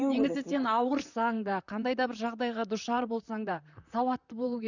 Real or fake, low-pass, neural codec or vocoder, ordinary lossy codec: fake; 7.2 kHz; vocoder, 44.1 kHz, 128 mel bands, Pupu-Vocoder; none